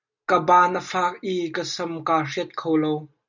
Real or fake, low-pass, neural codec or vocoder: real; 7.2 kHz; none